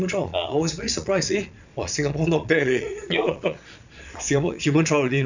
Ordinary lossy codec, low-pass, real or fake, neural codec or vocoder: none; 7.2 kHz; fake; vocoder, 22.05 kHz, 80 mel bands, Vocos